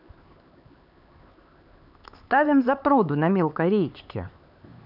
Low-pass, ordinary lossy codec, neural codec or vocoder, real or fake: 5.4 kHz; none; codec, 16 kHz, 4 kbps, X-Codec, HuBERT features, trained on LibriSpeech; fake